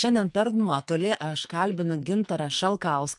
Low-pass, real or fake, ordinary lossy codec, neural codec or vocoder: 10.8 kHz; fake; MP3, 64 kbps; codec, 44.1 kHz, 2.6 kbps, SNAC